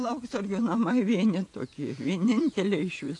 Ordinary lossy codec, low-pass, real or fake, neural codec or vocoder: AAC, 48 kbps; 10.8 kHz; fake; vocoder, 48 kHz, 128 mel bands, Vocos